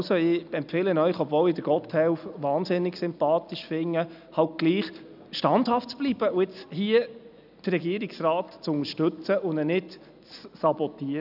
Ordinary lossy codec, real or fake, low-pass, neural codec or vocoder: none; real; 5.4 kHz; none